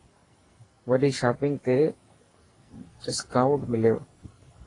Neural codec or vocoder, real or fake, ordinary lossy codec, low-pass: codec, 44.1 kHz, 2.6 kbps, SNAC; fake; AAC, 32 kbps; 10.8 kHz